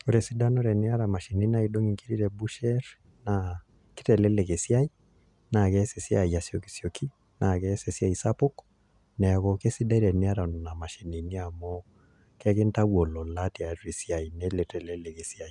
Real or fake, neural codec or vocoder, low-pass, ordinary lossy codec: real; none; 10.8 kHz; none